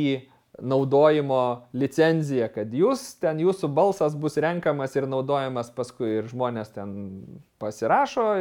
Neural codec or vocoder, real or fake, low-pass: none; real; 19.8 kHz